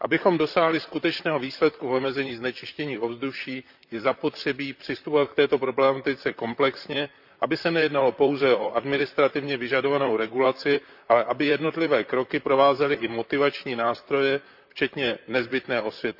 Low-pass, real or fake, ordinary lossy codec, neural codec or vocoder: 5.4 kHz; fake; none; vocoder, 44.1 kHz, 128 mel bands, Pupu-Vocoder